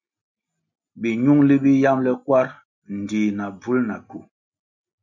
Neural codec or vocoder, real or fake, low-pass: none; real; 7.2 kHz